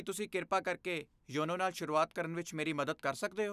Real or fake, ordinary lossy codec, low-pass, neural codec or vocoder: real; none; 14.4 kHz; none